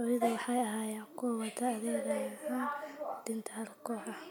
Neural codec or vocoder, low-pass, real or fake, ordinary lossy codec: none; none; real; none